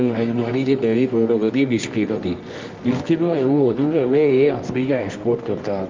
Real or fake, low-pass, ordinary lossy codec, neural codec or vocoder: fake; 7.2 kHz; Opus, 32 kbps; codec, 24 kHz, 0.9 kbps, WavTokenizer, medium music audio release